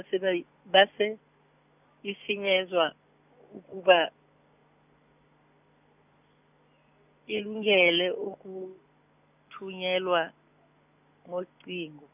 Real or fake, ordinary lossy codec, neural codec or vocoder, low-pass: real; none; none; 3.6 kHz